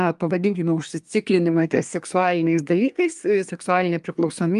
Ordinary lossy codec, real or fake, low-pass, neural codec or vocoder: Opus, 32 kbps; fake; 10.8 kHz; codec, 24 kHz, 1 kbps, SNAC